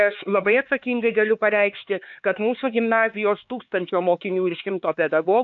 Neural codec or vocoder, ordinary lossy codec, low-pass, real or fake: codec, 16 kHz, 4 kbps, X-Codec, HuBERT features, trained on LibriSpeech; AAC, 64 kbps; 7.2 kHz; fake